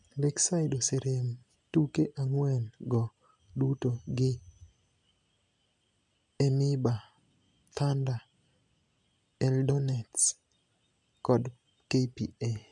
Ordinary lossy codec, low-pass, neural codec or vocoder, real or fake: MP3, 96 kbps; 10.8 kHz; none; real